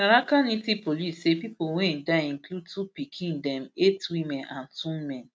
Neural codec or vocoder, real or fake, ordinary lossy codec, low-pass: none; real; none; none